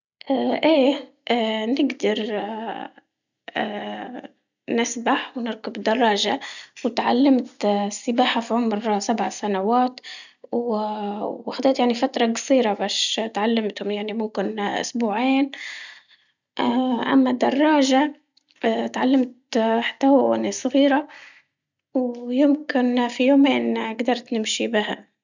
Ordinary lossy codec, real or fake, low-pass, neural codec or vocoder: none; real; 7.2 kHz; none